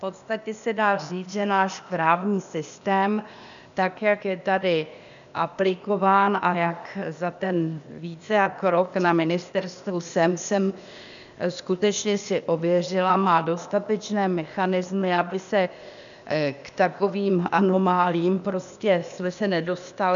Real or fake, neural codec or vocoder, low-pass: fake; codec, 16 kHz, 0.8 kbps, ZipCodec; 7.2 kHz